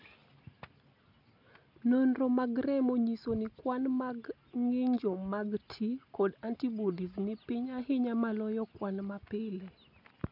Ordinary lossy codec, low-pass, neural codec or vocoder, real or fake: none; 5.4 kHz; none; real